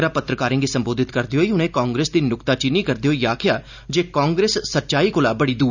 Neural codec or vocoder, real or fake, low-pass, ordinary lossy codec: none; real; 7.2 kHz; none